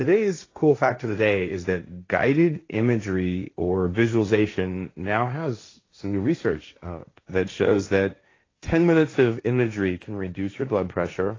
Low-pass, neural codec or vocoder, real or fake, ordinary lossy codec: 7.2 kHz; codec, 16 kHz, 1.1 kbps, Voila-Tokenizer; fake; AAC, 32 kbps